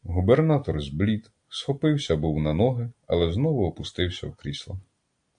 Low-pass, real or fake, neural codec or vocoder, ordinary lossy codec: 9.9 kHz; real; none; AAC, 64 kbps